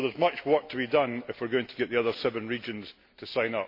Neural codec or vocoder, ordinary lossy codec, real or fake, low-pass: none; MP3, 32 kbps; real; 5.4 kHz